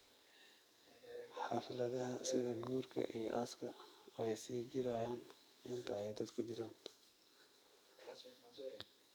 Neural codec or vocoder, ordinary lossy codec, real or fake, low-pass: codec, 44.1 kHz, 2.6 kbps, SNAC; none; fake; none